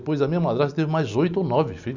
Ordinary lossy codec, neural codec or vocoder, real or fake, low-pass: none; none; real; 7.2 kHz